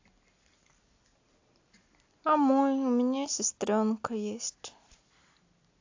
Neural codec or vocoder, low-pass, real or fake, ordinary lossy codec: none; 7.2 kHz; real; AAC, 48 kbps